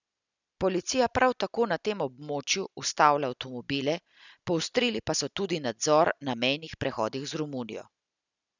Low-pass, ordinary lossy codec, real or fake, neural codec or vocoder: 7.2 kHz; none; real; none